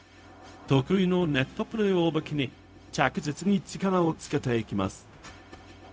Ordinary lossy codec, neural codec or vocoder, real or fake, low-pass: none; codec, 16 kHz, 0.4 kbps, LongCat-Audio-Codec; fake; none